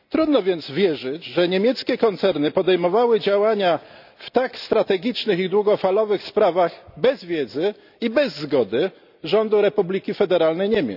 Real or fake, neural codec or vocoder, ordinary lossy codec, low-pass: real; none; none; 5.4 kHz